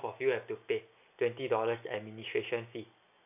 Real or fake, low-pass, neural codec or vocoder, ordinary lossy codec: real; 3.6 kHz; none; none